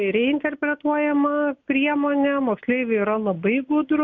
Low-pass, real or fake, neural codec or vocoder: 7.2 kHz; real; none